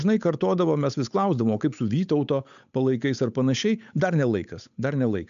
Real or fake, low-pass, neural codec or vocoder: fake; 7.2 kHz; codec, 16 kHz, 8 kbps, FunCodec, trained on Chinese and English, 25 frames a second